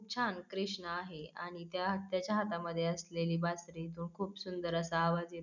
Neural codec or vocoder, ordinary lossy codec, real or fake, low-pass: none; none; real; 7.2 kHz